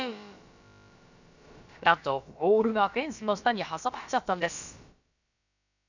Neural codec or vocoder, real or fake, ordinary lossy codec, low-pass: codec, 16 kHz, about 1 kbps, DyCAST, with the encoder's durations; fake; none; 7.2 kHz